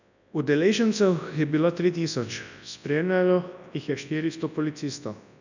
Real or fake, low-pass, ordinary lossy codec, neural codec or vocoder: fake; 7.2 kHz; none; codec, 24 kHz, 0.9 kbps, WavTokenizer, large speech release